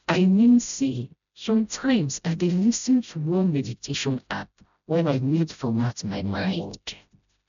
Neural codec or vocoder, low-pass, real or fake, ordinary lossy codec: codec, 16 kHz, 0.5 kbps, FreqCodec, smaller model; 7.2 kHz; fake; none